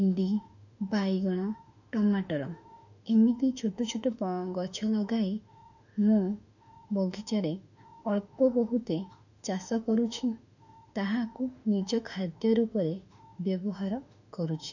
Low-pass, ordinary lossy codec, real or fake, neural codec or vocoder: 7.2 kHz; none; fake; autoencoder, 48 kHz, 32 numbers a frame, DAC-VAE, trained on Japanese speech